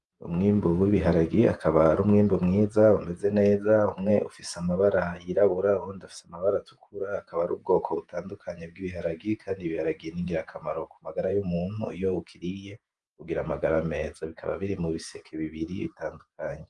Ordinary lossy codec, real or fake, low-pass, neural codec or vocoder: Opus, 32 kbps; fake; 10.8 kHz; vocoder, 48 kHz, 128 mel bands, Vocos